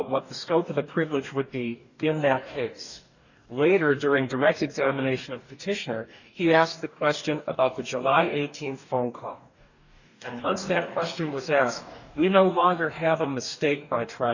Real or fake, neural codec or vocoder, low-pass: fake; codec, 44.1 kHz, 2.6 kbps, DAC; 7.2 kHz